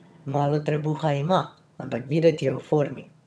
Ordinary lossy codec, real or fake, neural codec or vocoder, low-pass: none; fake; vocoder, 22.05 kHz, 80 mel bands, HiFi-GAN; none